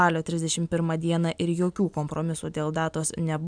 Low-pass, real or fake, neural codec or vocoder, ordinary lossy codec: 9.9 kHz; real; none; MP3, 96 kbps